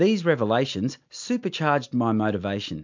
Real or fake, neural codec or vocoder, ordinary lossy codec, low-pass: real; none; MP3, 64 kbps; 7.2 kHz